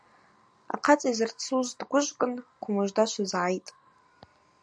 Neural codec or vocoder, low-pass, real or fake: none; 9.9 kHz; real